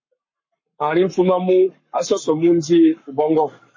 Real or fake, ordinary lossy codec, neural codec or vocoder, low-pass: fake; MP3, 32 kbps; codec, 44.1 kHz, 7.8 kbps, Pupu-Codec; 7.2 kHz